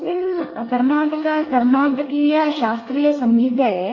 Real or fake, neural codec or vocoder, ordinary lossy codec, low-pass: fake; codec, 24 kHz, 1 kbps, SNAC; AAC, 32 kbps; 7.2 kHz